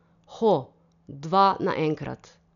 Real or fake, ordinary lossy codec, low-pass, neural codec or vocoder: real; none; 7.2 kHz; none